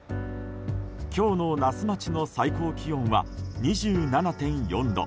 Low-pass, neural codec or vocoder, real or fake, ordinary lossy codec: none; none; real; none